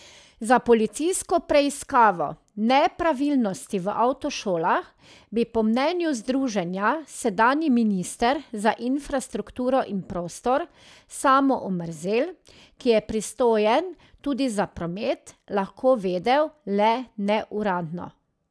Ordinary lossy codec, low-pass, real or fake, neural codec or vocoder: none; none; real; none